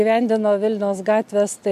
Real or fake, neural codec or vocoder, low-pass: real; none; 14.4 kHz